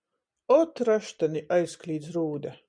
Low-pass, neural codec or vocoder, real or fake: 9.9 kHz; none; real